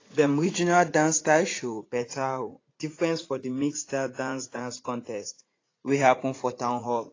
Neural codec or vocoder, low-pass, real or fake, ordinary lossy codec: vocoder, 44.1 kHz, 80 mel bands, Vocos; 7.2 kHz; fake; AAC, 32 kbps